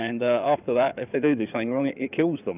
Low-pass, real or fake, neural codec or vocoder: 3.6 kHz; fake; codec, 16 kHz in and 24 kHz out, 2.2 kbps, FireRedTTS-2 codec